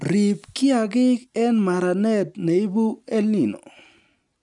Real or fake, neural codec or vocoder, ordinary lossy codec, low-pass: real; none; none; 10.8 kHz